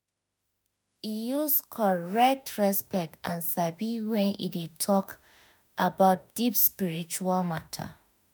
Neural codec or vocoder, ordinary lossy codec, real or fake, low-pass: autoencoder, 48 kHz, 32 numbers a frame, DAC-VAE, trained on Japanese speech; none; fake; none